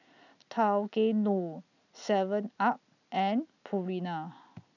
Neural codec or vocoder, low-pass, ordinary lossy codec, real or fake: none; 7.2 kHz; none; real